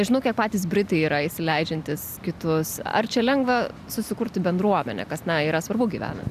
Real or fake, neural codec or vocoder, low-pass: real; none; 14.4 kHz